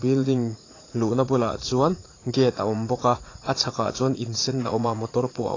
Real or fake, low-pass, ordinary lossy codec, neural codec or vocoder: fake; 7.2 kHz; AAC, 32 kbps; vocoder, 44.1 kHz, 80 mel bands, Vocos